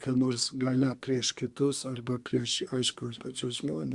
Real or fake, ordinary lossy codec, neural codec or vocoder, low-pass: fake; Opus, 64 kbps; codec, 24 kHz, 1 kbps, SNAC; 10.8 kHz